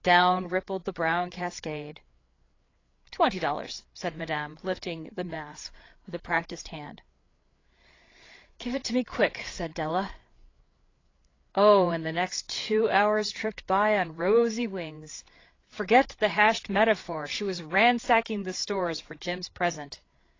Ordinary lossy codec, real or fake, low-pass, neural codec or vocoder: AAC, 32 kbps; fake; 7.2 kHz; codec, 16 kHz, 8 kbps, FreqCodec, larger model